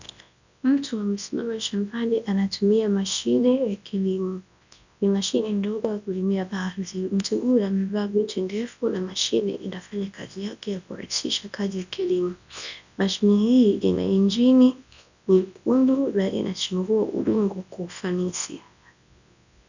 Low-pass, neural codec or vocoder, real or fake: 7.2 kHz; codec, 24 kHz, 0.9 kbps, WavTokenizer, large speech release; fake